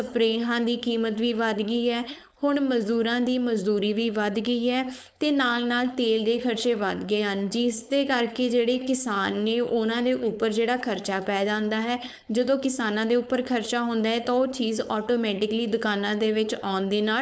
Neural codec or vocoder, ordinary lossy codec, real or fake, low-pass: codec, 16 kHz, 4.8 kbps, FACodec; none; fake; none